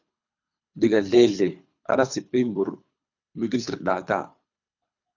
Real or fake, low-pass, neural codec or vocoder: fake; 7.2 kHz; codec, 24 kHz, 3 kbps, HILCodec